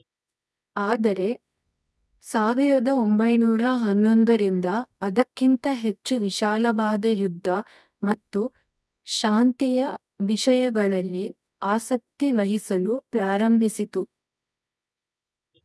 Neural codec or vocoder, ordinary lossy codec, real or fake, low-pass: codec, 24 kHz, 0.9 kbps, WavTokenizer, medium music audio release; none; fake; none